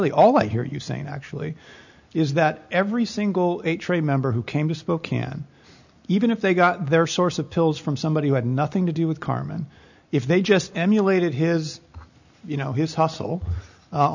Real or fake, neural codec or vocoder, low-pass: real; none; 7.2 kHz